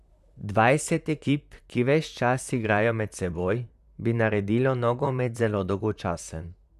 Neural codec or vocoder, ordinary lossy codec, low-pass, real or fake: vocoder, 44.1 kHz, 128 mel bands, Pupu-Vocoder; none; 14.4 kHz; fake